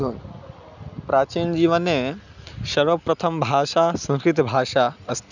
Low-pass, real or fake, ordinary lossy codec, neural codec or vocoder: 7.2 kHz; real; none; none